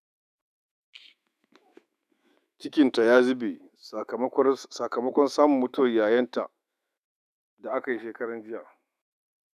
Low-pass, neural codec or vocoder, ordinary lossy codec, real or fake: 14.4 kHz; autoencoder, 48 kHz, 128 numbers a frame, DAC-VAE, trained on Japanese speech; none; fake